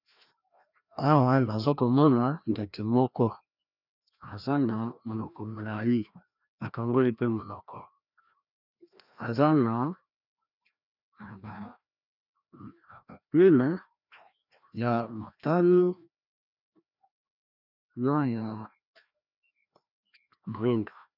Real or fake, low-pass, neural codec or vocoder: fake; 5.4 kHz; codec, 16 kHz, 1 kbps, FreqCodec, larger model